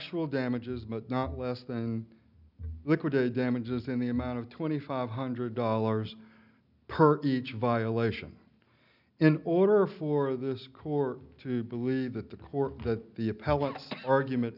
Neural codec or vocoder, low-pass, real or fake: none; 5.4 kHz; real